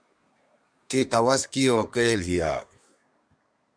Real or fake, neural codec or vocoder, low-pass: fake; codec, 24 kHz, 1 kbps, SNAC; 9.9 kHz